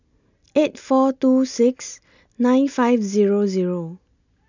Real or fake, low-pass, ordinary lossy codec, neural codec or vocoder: real; 7.2 kHz; none; none